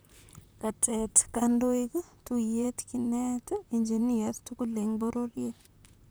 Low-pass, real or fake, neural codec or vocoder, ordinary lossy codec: none; fake; vocoder, 44.1 kHz, 128 mel bands, Pupu-Vocoder; none